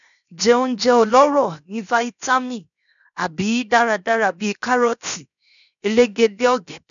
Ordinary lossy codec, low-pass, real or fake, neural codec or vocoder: none; 7.2 kHz; fake; codec, 16 kHz, 0.7 kbps, FocalCodec